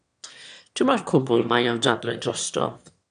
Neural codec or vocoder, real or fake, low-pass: autoencoder, 22.05 kHz, a latent of 192 numbers a frame, VITS, trained on one speaker; fake; 9.9 kHz